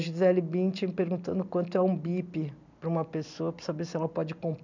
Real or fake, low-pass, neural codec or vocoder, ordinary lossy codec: real; 7.2 kHz; none; none